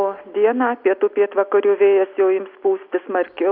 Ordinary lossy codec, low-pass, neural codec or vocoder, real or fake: Opus, 64 kbps; 5.4 kHz; none; real